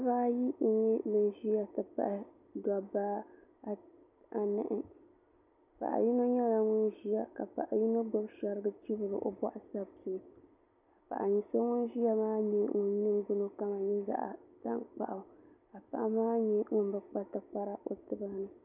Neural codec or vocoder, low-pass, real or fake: none; 3.6 kHz; real